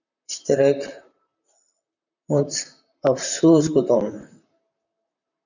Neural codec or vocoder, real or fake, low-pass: vocoder, 44.1 kHz, 128 mel bands, Pupu-Vocoder; fake; 7.2 kHz